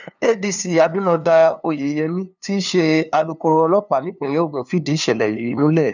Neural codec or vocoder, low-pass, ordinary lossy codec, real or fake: codec, 16 kHz, 2 kbps, FunCodec, trained on LibriTTS, 25 frames a second; 7.2 kHz; none; fake